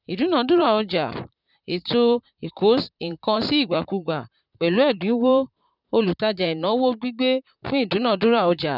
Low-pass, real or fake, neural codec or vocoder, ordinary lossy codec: 5.4 kHz; fake; vocoder, 44.1 kHz, 128 mel bands, Pupu-Vocoder; none